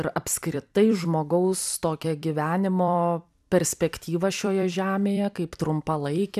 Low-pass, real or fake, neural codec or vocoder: 14.4 kHz; fake; vocoder, 44.1 kHz, 128 mel bands every 256 samples, BigVGAN v2